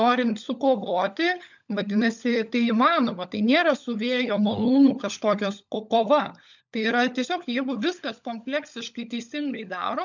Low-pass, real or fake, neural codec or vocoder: 7.2 kHz; fake; codec, 16 kHz, 16 kbps, FunCodec, trained on LibriTTS, 50 frames a second